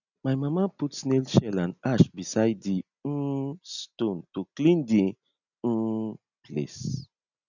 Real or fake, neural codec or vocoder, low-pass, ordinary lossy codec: real; none; 7.2 kHz; none